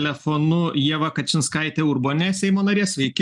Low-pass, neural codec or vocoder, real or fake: 10.8 kHz; none; real